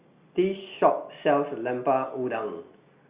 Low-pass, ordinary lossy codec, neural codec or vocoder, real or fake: 3.6 kHz; Opus, 64 kbps; none; real